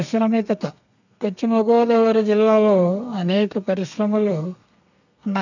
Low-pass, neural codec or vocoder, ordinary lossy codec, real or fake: 7.2 kHz; codec, 32 kHz, 1.9 kbps, SNAC; none; fake